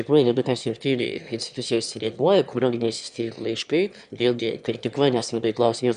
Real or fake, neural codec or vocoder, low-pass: fake; autoencoder, 22.05 kHz, a latent of 192 numbers a frame, VITS, trained on one speaker; 9.9 kHz